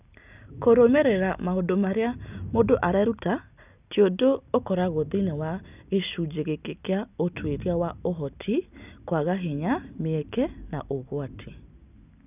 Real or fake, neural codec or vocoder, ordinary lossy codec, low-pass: real; none; none; 3.6 kHz